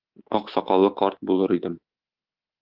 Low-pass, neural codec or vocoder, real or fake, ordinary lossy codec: 5.4 kHz; codec, 24 kHz, 3.1 kbps, DualCodec; fake; Opus, 16 kbps